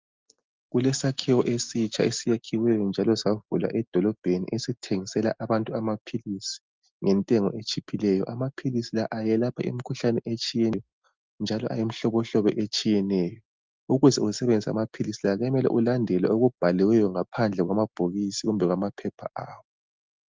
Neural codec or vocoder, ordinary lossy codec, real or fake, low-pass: none; Opus, 32 kbps; real; 7.2 kHz